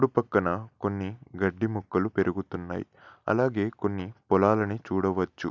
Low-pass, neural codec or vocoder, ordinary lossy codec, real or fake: 7.2 kHz; none; none; real